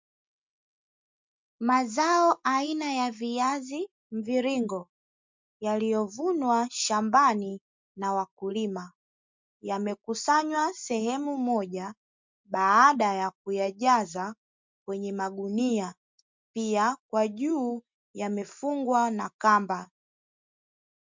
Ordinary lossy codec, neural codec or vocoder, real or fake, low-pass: MP3, 64 kbps; none; real; 7.2 kHz